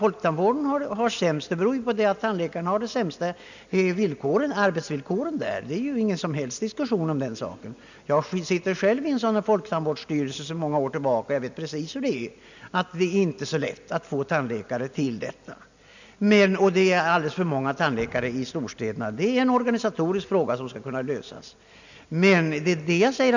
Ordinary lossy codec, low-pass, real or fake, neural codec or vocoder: none; 7.2 kHz; real; none